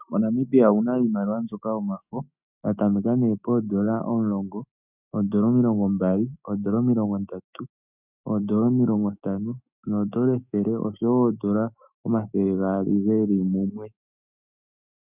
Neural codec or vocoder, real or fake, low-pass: autoencoder, 48 kHz, 128 numbers a frame, DAC-VAE, trained on Japanese speech; fake; 3.6 kHz